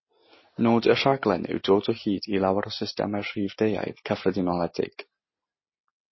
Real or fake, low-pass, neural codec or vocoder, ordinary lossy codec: real; 7.2 kHz; none; MP3, 24 kbps